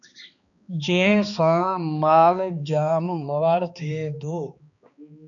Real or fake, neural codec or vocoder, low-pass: fake; codec, 16 kHz, 2 kbps, X-Codec, HuBERT features, trained on balanced general audio; 7.2 kHz